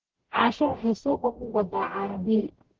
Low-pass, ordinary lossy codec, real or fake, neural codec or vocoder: 7.2 kHz; Opus, 16 kbps; fake; codec, 44.1 kHz, 0.9 kbps, DAC